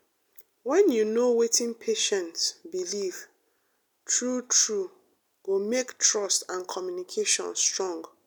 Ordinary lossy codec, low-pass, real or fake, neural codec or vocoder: none; none; real; none